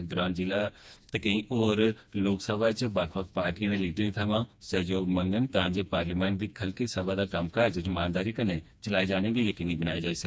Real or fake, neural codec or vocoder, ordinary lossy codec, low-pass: fake; codec, 16 kHz, 2 kbps, FreqCodec, smaller model; none; none